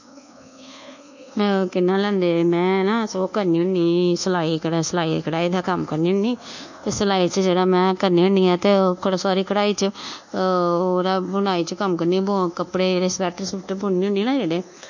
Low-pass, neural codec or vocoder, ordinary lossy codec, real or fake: 7.2 kHz; codec, 24 kHz, 1.2 kbps, DualCodec; none; fake